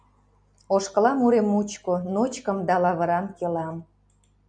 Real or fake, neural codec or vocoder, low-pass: real; none; 9.9 kHz